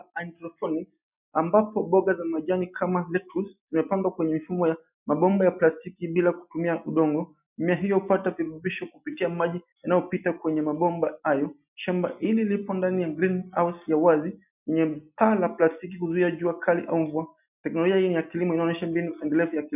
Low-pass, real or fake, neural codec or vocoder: 3.6 kHz; real; none